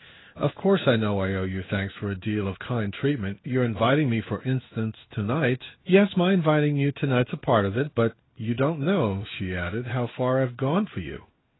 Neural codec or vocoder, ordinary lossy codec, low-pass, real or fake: codec, 16 kHz in and 24 kHz out, 1 kbps, XY-Tokenizer; AAC, 16 kbps; 7.2 kHz; fake